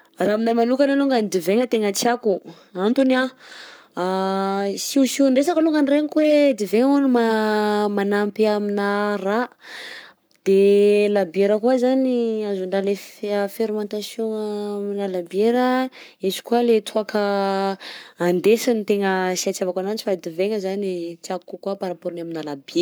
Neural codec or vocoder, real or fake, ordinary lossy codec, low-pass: codec, 44.1 kHz, 7.8 kbps, Pupu-Codec; fake; none; none